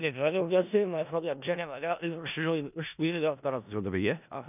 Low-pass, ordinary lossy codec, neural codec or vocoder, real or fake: 3.6 kHz; none; codec, 16 kHz in and 24 kHz out, 0.4 kbps, LongCat-Audio-Codec, four codebook decoder; fake